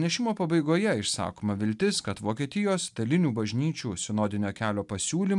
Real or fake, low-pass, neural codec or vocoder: real; 10.8 kHz; none